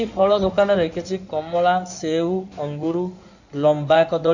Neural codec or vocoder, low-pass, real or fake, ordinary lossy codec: codec, 16 kHz in and 24 kHz out, 2.2 kbps, FireRedTTS-2 codec; 7.2 kHz; fake; none